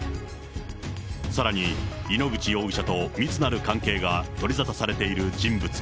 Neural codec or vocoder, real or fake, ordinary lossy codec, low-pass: none; real; none; none